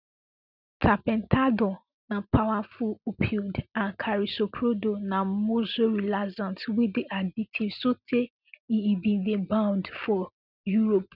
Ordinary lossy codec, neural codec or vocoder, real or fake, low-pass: none; none; real; 5.4 kHz